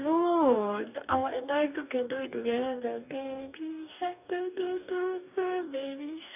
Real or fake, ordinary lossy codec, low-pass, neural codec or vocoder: fake; none; 3.6 kHz; codec, 44.1 kHz, 2.6 kbps, DAC